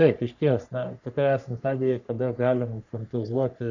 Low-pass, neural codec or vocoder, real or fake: 7.2 kHz; codec, 44.1 kHz, 3.4 kbps, Pupu-Codec; fake